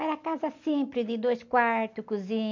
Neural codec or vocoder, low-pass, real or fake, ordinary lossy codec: none; 7.2 kHz; real; MP3, 64 kbps